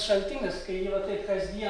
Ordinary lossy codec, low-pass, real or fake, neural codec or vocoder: MP3, 96 kbps; 9.9 kHz; real; none